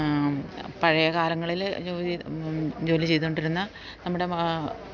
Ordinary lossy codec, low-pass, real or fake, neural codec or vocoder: none; 7.2 kHz; real; none